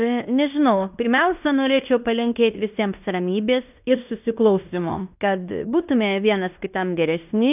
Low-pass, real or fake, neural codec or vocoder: 3.6 kHz; fake; codec, 16 kHz in and 24 kHz out, 0.9 kbps, LongCat-Audio-Codec, fine tuned four codebook decoder